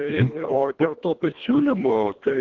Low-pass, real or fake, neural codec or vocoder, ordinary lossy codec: 7.2 kHz; fake; codec, 24 kHz, 1.5 kbps, HILCodec; Opus, 16 kbps